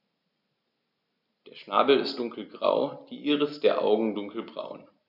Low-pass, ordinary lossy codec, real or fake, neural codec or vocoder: 5.4 kHz; none; real; none